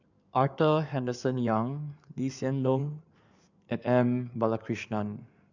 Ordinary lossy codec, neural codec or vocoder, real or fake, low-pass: none; codec, 16 kHz in and 24 kHz out, 2.2 kbps, FireRedTTS-2 codec; fake; 7.2 kHz